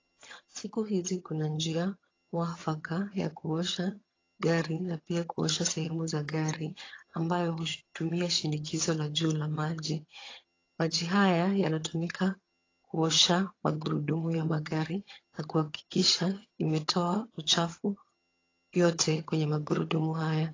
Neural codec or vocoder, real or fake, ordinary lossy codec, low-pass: vocoder, 22.05 kHz, 80 mel bands, HiFi-GAN; fake; AAC, 32 kbps; 7.2 kHz